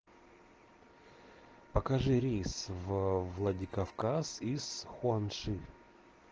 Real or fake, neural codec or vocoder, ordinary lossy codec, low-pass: real; none; Opus, 16 kbps; 7.2 kHz